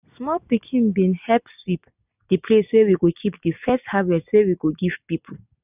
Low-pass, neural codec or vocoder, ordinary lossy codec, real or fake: 3.6 kHz; none; none; real